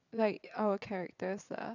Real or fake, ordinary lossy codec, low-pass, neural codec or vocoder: fake; none; 7.2 kHz; vocoder, 22.05 kHz, 80 mel bands, WaveNeXt